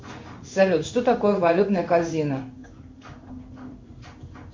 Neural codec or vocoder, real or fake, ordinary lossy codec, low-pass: codec, 16 kHz in and 24 kHz out, 1 kbps, XY-Tokenizer; fake; MP3, 48 kbps; 7.2 kHz